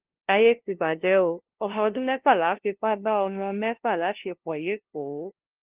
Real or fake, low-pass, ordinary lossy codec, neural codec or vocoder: fake; 3.6 kHz; Opus, 16 kbps; codec, 16 kHz, 0.5 kbps, FunCodec, trained on LibriTTS, 25 frames a second